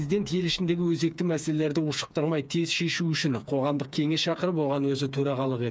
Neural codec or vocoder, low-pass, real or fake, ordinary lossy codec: codec, 16 kHz, 4 kbps, FreqCodec, smaller model; none; fake; none